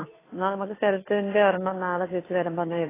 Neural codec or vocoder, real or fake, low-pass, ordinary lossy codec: codec, 16 kHz, 2 kbps, FunCodec, trained on Chinese and English, 25 frames a second; fake; 3.6 kHz; AAC, 16 kbps